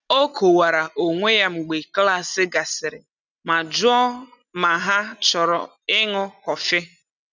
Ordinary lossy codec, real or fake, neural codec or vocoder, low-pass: none; real; none; 7.2 kHz